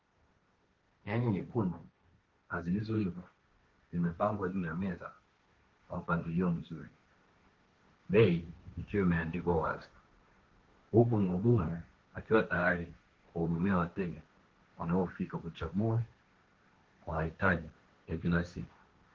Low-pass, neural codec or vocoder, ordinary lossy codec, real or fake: 7.2 kHz; codec, 16 kHz, 1.1 kbps, Voila-Tokenizer; Opus, 16 kbps; fake